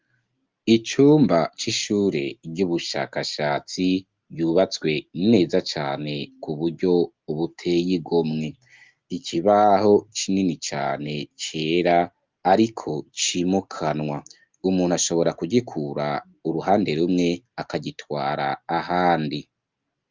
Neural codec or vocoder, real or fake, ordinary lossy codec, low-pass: none; real; Opus, 24 kbps; 7.2 kHz